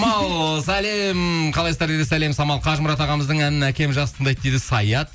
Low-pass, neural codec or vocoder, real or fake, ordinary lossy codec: none; none; real; none